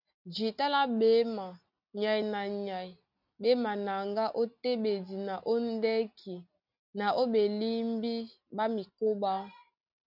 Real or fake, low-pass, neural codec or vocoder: real; 5.4 kHz; none